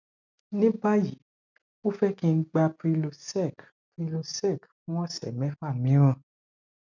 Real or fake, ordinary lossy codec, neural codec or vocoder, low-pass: real; none; none; 7.2 kHz